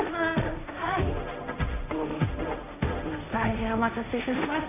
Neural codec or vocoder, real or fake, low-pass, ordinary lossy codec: codec, 16 kHz, 1.1 kbps, Voila-Tokenizer; fake; 3.6 kHz; none